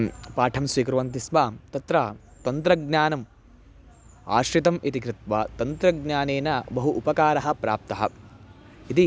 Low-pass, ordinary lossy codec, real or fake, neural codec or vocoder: none; none; real; none